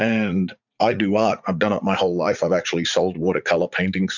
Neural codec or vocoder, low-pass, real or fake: vocoder, 44.1 kHz, 80 mel bands, Vocos; 7.2 kHz; fake